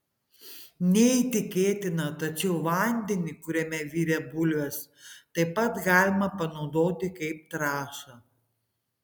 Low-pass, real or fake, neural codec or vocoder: 19.8 kHz; real; none